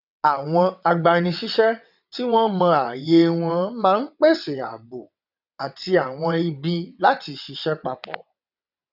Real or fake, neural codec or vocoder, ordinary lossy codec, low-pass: fake; vocoder, 22.05 kHz, 80 mel bands, WaveNeXt; none; 5.4 kHz